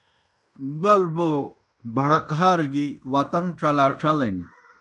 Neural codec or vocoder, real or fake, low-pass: codec, 16 kHz in and 24 kHz out, 0.9 kbps, LongCat-Audio-Codec, fine tuned four codebook decoder; fake; 10.8 kHz